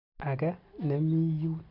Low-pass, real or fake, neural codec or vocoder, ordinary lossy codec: 5.4 kHz; real; none; none